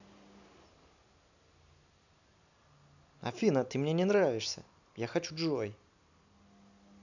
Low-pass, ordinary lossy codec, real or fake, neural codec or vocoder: 7.2 kHz; none; real; none